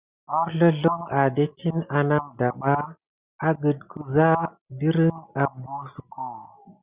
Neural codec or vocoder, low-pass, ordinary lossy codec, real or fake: none; 3.6 kHz; Opus, 64 kbps; real